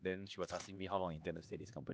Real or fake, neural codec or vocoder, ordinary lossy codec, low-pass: fake; codec, 16 kHz, 2 kbps, X-Codec, HuBERT features, trained on LibriSpeech; none; none